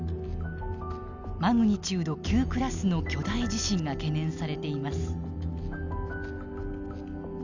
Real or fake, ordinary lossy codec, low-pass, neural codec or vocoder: real; none; 7.2 kHz; none